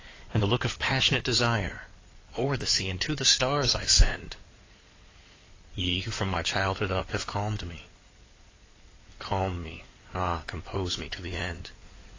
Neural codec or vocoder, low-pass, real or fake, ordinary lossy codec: codec, 16 kHz in and 24 kHz out, 2.2 kbps, FireRedTTS-2 codec; 7.2 kHz; fake; AAC, 32 kbps